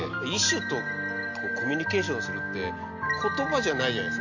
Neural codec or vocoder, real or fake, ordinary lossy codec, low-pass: none; real; none; 7.2 kHz